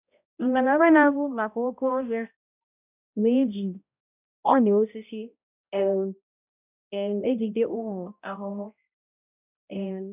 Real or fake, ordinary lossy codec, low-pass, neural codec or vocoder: fake; none; 3.6 kHz; codec, 16 kHz, 0.5 kbps, X-Codec, HuBERT features, trained on balanced general audio